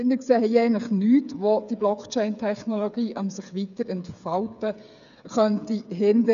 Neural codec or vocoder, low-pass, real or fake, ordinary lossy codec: codec, 16 kHz, 8 kbps, FreqCodec, smaller model; 7.2 kHz; fake; none